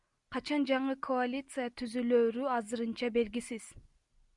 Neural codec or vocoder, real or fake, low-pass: none; real; 10.8 kHz